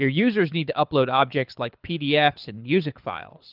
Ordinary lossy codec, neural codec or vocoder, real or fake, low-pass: Opus, 24 kbps; codec, 24 kHz, 6 kbps, HILCodec; fake; 5.4 kHz